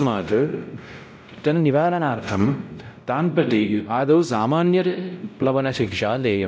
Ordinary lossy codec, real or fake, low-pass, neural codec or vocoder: none; fake; none; codec, 16 kHz, 0.5 kbps, X-Codec, WavLM features, trained on Multilingual LibriSpeech